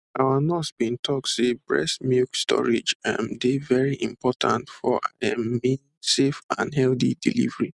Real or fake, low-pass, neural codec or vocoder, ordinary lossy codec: real; 10.8 kHz; none; none